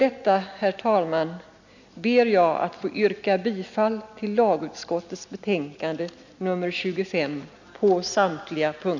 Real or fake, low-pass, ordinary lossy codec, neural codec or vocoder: real; 7.2 kHz; none; none